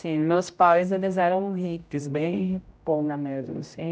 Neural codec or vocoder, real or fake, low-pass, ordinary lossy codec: codec, 16 kHz, 0.5 kbps, X-Codec, HuBERT features, trained on general audio; fake; none; none